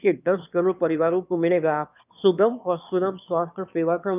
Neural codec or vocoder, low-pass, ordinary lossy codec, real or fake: autoencoder, 22.05 kHz, a latent of 192 numbers a frame, VITS, trained on one speaker; 3.6 kHz; none; fake